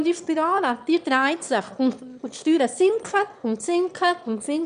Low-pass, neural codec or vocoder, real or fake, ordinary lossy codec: 9.9 kHz; autoencoder, 22.05 kHz, a latent of 192 numbers a frame, VITS, trained on one speaker; fake; none